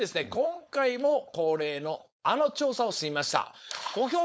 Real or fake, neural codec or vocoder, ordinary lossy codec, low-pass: fake; codec, 16 kHz, 4.8 kbps, FACodec; none; none